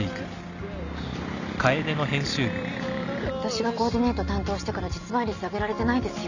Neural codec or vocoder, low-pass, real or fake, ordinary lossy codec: none; 7.2 kHz; real; none